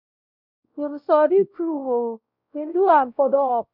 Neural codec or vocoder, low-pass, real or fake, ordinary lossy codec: codec, 16 kHz, 0.5 kbps, X-Codec, WavLM features, trained on Multilingual LibriSpeech; 5.4 kHz; fake; none